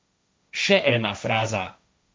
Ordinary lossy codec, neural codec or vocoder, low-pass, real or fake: none; codec, 16 kHz, 1.1 kbps, Voila-Tokenizer; none; fake